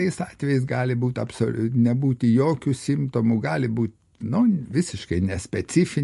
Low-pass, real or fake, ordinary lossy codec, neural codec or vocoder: 14.4 kHz; real; MP3, 48 kbps; none